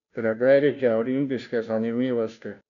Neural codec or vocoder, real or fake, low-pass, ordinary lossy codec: codec, 16 kHz, 0.5 kbps, FunCodec, trained on Chinese and English, 25 frames a second; fake; 7.2 kHz; none